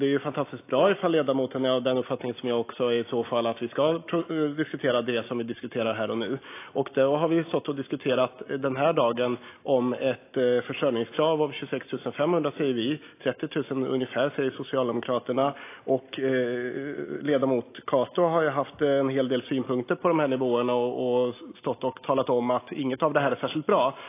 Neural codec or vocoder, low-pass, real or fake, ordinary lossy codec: none; 3.6 kHz; real; AAC, 24 kbps